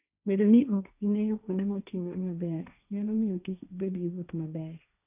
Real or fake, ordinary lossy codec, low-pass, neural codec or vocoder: fake; none; 3.6 kHz; codec, 16 kHz, 1.1 kbps, Voila-Tokenizer